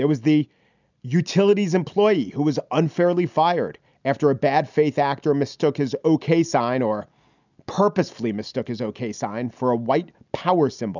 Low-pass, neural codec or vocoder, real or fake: 7.2 kHz; none; real